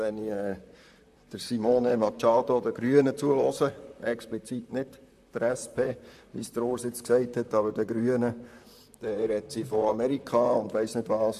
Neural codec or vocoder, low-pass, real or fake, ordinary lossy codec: vocoder, 44.1 kHz, 128 mel bands, Pupu-Vocoder; 14.4 kHz; fake; AAC, 96 kbps